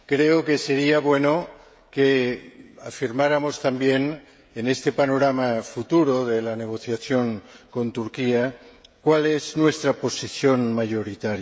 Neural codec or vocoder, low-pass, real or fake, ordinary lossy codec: codec, 16 kHz, 16 kbps, FreqCodec, smaller model; none; fake; none